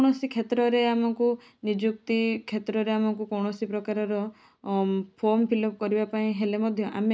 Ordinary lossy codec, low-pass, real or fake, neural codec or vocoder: none; none; real; none